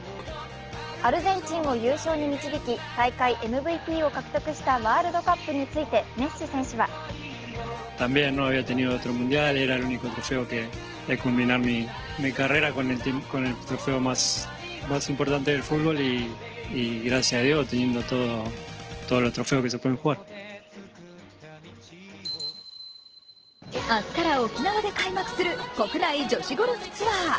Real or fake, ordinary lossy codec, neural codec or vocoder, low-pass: real; Opus, 16 kbps; none; 7.2 kHz